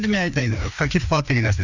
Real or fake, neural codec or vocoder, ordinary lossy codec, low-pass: fake; codec, 16 kHz, 2 kbps, FreqCodec, larger model; none; 7.2 kHz